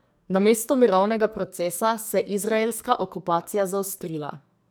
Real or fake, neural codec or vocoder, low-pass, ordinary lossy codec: fake; codec, 44.1 kHz, 2.6 kbps, SNAC; none; none